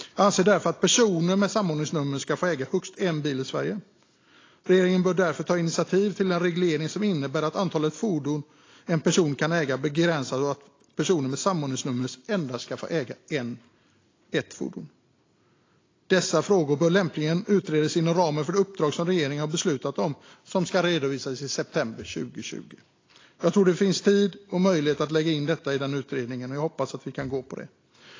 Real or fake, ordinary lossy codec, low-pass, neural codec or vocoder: real; AAC, 32 kbps; 7.2 kHz; none